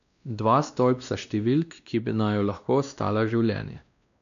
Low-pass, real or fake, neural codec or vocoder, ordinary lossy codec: 7.2 kHz; fake; codec, 16 kHz, 1 kbps, X-Codec, WavLM features, trained on Multilingual LibriSpeech; none